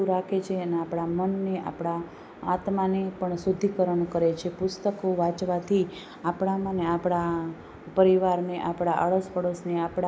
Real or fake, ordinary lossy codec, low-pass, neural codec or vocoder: real; none; none; none